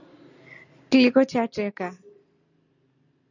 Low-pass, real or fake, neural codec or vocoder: 7.2 kHz; real; none